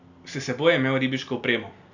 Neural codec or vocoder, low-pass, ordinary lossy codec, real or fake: none; 7.2 kHz; none; real